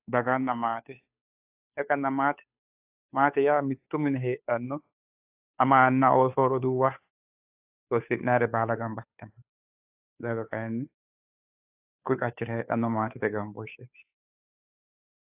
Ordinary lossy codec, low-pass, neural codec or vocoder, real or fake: AAC, 32 kbps; 3.6 kHz; codec, 16 kHz, 2 kbps, FunCodec, trained on Chinese and English, 25 frames a second; fake